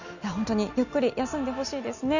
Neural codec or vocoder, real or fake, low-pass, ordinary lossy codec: none; real; 7.2 kHz; none